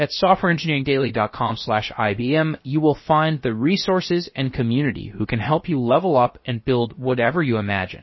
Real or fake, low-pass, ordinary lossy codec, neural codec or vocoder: fake; 7.2 kHz; MP3, 24 kbps; codec, 16 kHz, about 1 kbps, DyCAST, with the encoder's durations